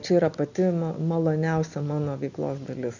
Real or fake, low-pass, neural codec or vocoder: real; 7.2 kHz; none